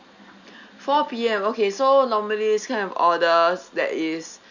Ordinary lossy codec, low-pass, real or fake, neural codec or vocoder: none; 7.2 kHz; real; none